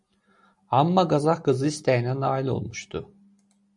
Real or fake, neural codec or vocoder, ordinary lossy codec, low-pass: real; none; MP3, 48 kbps; 10.8 kHz